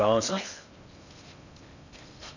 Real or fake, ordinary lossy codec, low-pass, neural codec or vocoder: fake; none; 7.2 kHz; codec, 16 kHz in and 24 kHz out, 0.6 kbps, FocalCodec, streaming, 2048 codes